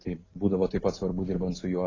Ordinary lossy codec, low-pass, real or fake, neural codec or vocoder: AAC, 32 kbps; 7.2 kHz; real; none